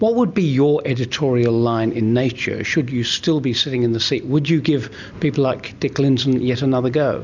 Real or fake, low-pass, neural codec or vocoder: real; 7.2 kHz; none